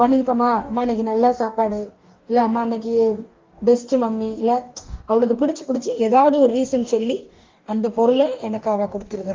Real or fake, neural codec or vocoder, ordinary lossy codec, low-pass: fake; codec, 44.1 kHz, 2.6 kbps, DAC; Opus, 24 kbps; 7.2 kHz